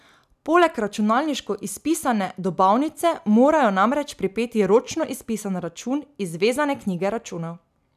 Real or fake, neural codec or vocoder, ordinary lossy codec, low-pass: real; none; none; 14.4 kHz